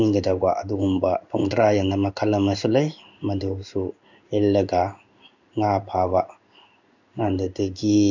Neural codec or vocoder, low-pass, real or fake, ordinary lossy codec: codec, 16 kHz in and 24 kHz out, 1 kbps, XY-Tokenizer; 7.2 kHz; fake; none